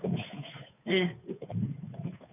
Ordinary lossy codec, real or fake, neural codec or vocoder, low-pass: none; fake; codec, 16 kHz, 2 kbps, FunCodec, trained on Chinese and English, 25 frames a second; 3.6 kHz